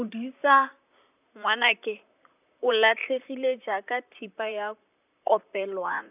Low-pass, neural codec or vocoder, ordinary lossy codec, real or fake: 3.6 kHz; vocoder, 44.1 kHz, 128 mel bands, Pupu-Vocoder; none; fake